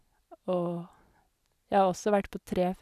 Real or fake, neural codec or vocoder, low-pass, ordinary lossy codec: real; none; 14.4 kHz; none